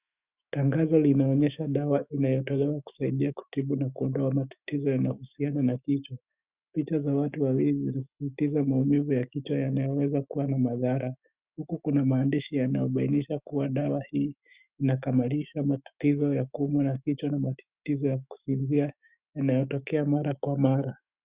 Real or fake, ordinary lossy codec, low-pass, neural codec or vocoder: fake; Opus, 64 kbps; 3.6 kHz; autoencoder, 48 kHz, 128 numbers a frame, DAC-VAE, trained on Japanese speech